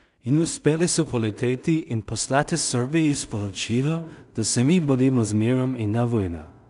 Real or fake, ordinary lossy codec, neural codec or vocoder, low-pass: fake; none; codec, 16 kHz in and 24 kHz out, 0.4 kbps, LongCat-Audio-Codec, two codebook decoder; 10.8 kHz